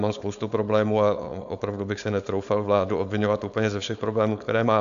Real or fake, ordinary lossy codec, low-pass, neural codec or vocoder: fake; AAC, 96 kbps; 7.2 kHz; codec, 16 kHz, 4.8 kbps, FACodec